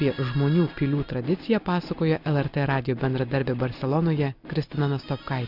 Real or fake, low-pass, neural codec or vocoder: real; 5.4 kHz; none